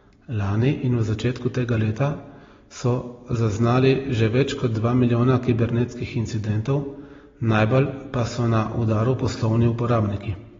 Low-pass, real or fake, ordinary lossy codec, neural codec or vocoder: 7.2 kHz; real; AAC, 24 kbps; none